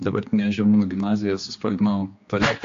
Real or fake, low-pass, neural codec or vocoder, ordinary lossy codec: fake; 7.2 kHz; codec, 16 kHz, 2 kbps, X-Codec, HuBERT features, trained on general audio; AAC, 48 kbps